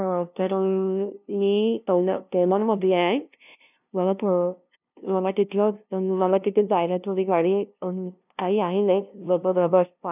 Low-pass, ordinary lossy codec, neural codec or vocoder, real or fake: 3.6 kHz; none; codec, 16 kHz, 0.5 kbps, FunCodec, trained on LibriTTS, 25 frames a second; fake